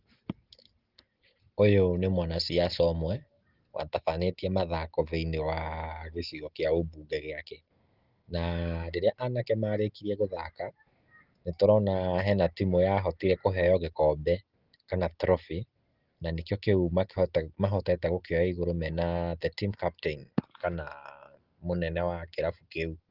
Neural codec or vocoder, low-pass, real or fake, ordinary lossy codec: none; 5.4 kHz; real; Opus, 16 kbps